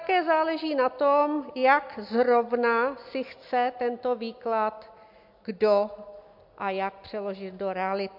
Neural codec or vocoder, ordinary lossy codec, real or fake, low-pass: none; MP3, 48 kbps; real; 5.4 kHz